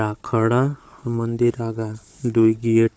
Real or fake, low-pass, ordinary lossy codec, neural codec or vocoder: fake; none; none; codec, 16 kHz, 4 kbps, FunCodec, trained on Chinese and English, 50 frames a second